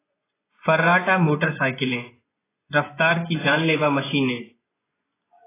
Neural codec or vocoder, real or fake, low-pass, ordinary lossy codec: vocoder, 24 kHz, 100 mel bands, Vocos; fake; 3.6 kHz; AAC, 16 kbps